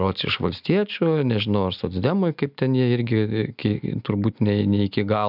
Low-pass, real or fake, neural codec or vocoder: 5.4 kHz; real; none